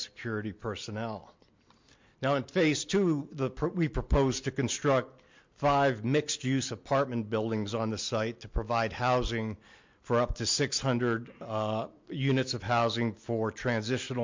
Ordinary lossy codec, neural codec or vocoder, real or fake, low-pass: MP3, 48 kbps; none; real; 7.2 kHz